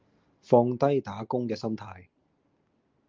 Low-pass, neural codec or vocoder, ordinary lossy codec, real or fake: 7.2 kHz; none; Opus, 32 kbps; real